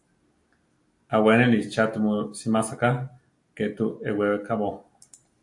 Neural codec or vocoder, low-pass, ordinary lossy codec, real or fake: none; 10.8 kHz; AAC, 64 kbps; real